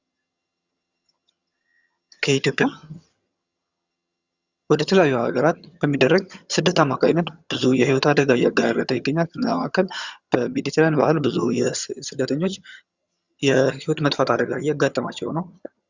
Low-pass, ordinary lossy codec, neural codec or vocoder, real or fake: 7.2 kHz; Opus, 64 kbps; vocoder, 22.05 kHz, 80 mel bands, HiFi-GAN; fake